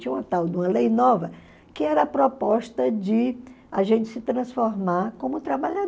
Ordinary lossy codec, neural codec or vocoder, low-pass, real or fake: none; none; none; real